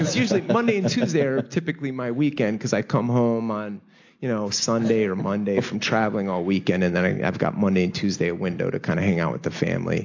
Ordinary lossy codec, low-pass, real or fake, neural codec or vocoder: AAC, 48 kbps; 7.2 kHz; real; none